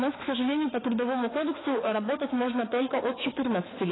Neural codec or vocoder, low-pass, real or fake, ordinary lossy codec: autoencoder, 48 kHz, 32 numbers a frame, DAC-VAE, trained on Japanese speech; 7.2 kHz; fake; AAC, 16 kbps